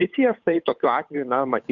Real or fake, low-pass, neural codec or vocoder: fake; 7.2 kHz; codec, 16 kHz, 8 kbps, FunCodec, trained on Chinese and English, 25 frames a second